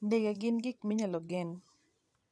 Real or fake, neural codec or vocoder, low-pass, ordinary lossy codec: fake; vocoder, 22.05 kHz, 80 mel bands, WaveNeXt; none; none